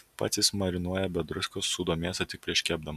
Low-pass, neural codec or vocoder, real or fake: 14.4 kHz; none; real